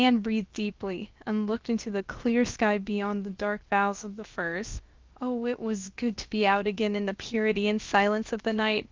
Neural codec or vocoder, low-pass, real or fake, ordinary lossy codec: codec, 16 kHz, about 1 kbps, DyCAST, with the encoder's durations; 7.2 kHz; fake; Opus, 32 kbps